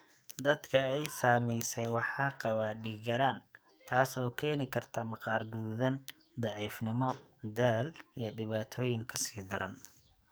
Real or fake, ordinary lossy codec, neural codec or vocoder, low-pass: fake; none; codec, 44.1 kHz, 2.6 kbps, SNAC; none